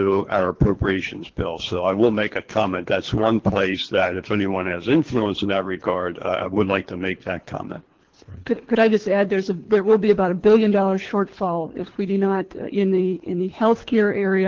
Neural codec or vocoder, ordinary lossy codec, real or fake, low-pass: codec, 24 kHz, 3 kbps, HILCodec; Opus, 16 kbps; fake; 7.2 kHz